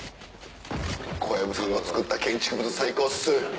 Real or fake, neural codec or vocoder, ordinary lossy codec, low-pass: real; none; none; none